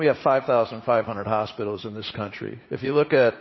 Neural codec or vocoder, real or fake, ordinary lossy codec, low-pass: vocoder, 44.1 kHz, 80 mel bands, Vocos; fake; MP3, 24 kbps; 7.2 kHz